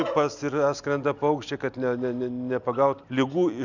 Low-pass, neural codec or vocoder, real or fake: 7.2 kHz; none; real